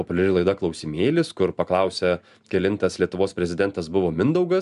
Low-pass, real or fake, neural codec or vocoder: 10.8 kHz; real; none